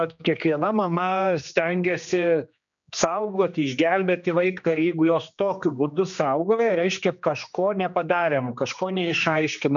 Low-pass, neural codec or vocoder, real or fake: 7.2 kHz; codec, 16 kHz, 2 kbps, X-Codec, HuBERT features, trained on general audio; fake